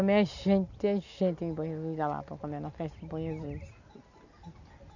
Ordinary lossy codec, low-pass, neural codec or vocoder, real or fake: none; 7.2 kHz; none; real